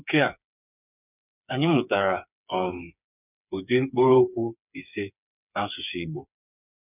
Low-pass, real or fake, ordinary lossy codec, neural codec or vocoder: 3.6 kHz; fake; none; codec, 16 kHz, 4 kbps, FreqCodec, smaller model